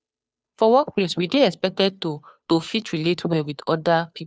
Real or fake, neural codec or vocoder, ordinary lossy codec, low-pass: fake; codec, 16 kHz, 2 kbps, FunCodec, trained on Chinese and English, 25 frames a second; none; none